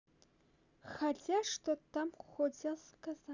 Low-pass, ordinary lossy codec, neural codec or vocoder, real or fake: 7.2 kHz; none; none; real